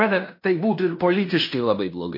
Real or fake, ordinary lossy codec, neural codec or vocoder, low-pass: fake; MP3, 32 kbps; codec, 16 kHz in and 24 kHz out, 0.9 kbps, LongCat-Audio-Codec, fine tuned four codebook decoder; 5.4 kHz